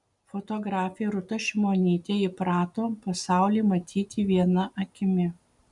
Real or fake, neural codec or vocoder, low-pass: real; none; 10.8 kHz